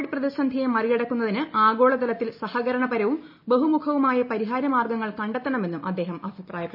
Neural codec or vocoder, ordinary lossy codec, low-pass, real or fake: none; MP3, 48 kbps; 5.4 kHz; real